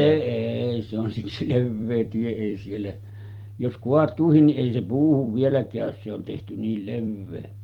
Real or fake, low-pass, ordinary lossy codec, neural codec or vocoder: fake; 19.8 kHz; Opus, 24 kbps; vocoder, 44.1 kHz, 128 mel bands every 256 samples, BigVGAN v2